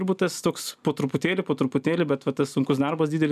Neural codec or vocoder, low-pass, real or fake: none; 14.4 kHz; real